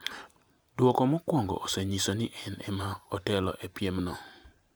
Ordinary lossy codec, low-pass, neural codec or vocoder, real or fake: none; none; vocoder, 44.1 kHz, 128 mel bands every 512 samples, BigVGAN v2; fake